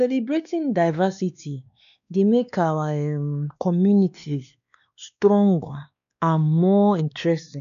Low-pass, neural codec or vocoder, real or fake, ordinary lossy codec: 7.2 kHz; codec, 16 kHz, 2 kbps, X-Codec, WavLM features, trained on Multilingual LibriSpeech; fake; none